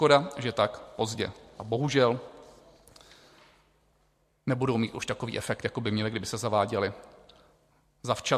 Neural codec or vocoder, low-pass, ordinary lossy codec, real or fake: vocoder, 44.1 kHz, 128 mel bands every 256 samples, BigVGAN v2; 14.4 kHz; MP3, 64 kbps; fake